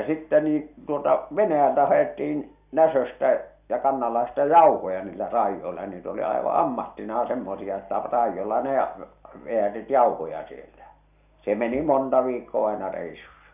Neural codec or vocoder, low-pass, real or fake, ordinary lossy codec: none; 3.6 kHz; real; none